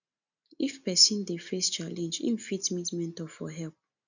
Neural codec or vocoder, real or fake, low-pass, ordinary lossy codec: none; real; 7.2 kHz; none